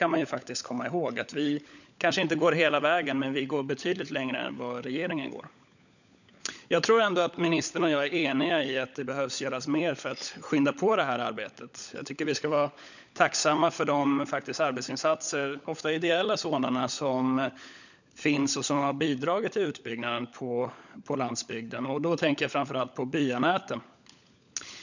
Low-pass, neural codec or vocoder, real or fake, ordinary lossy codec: 7.2 kHz; codec, 16 kHz, 16 kbps, FunCodec, trained on LibriTTS, 50 frames a second; fake; none